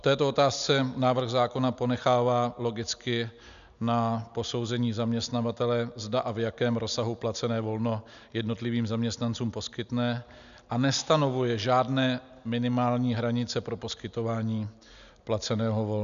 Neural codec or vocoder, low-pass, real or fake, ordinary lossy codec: none; 7.2 kHz; real; MP3, 96 kbps